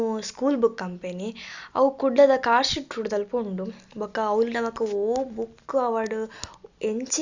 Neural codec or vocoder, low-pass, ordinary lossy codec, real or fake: none; 7.2 kHz; Opus, 64 kbps; real